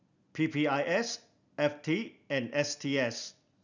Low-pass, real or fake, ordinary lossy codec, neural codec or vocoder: 7.2 kHz; real; none; none